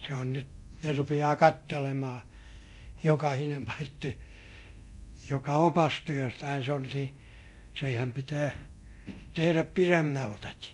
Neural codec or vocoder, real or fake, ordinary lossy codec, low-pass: codec, 24 kHz, 0.9 kbps, DualCodec; fake; MP3, 96 kbps; 10.8 kHz